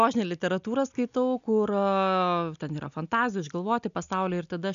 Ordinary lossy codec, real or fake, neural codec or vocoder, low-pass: AAC, 96 kbps; real; none; 7.2 kHz